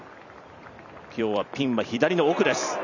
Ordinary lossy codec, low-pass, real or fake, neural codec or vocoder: none; 7.2 kHz; fake; vocoder, 44.1 kHz, 128 mel bands every 256 samples, BigVGAN v2